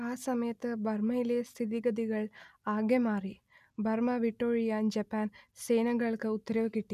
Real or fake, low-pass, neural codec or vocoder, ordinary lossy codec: real; 14.4 kHz; none; none